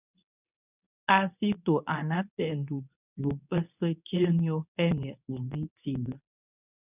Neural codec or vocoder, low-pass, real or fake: codec, 24 kHz, 0.9 kbps, WavTokenizer, medium speech release version 2; 3.6 kHz; fake